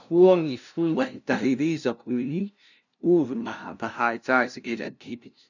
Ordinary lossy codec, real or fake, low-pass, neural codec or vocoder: none; fake; 7.2 kHz; codec, 16 kHz, 0.5 kbps, FunCodec, trained on LibriTTS, 25 frames a second